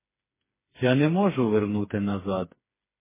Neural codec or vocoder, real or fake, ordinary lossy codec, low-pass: codec, 16 kHz, 4 kbps, FreqCodec, smaller model; fake; MP3, 16 kbps; 3.6 kHz